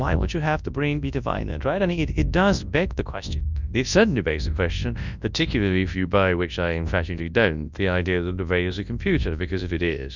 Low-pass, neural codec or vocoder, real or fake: 7.2 kHz; codec, 24 kHz, 0.9 kbps, WavTokenizer, large speech release; fake